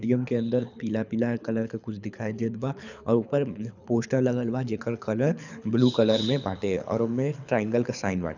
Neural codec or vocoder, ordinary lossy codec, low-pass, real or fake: codec, 24 kHz, 6 kbps, HILCodec; none; 7.2 kHz; fake